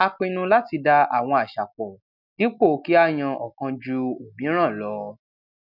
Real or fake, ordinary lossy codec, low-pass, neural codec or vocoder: real; none; 5.4 kHz; none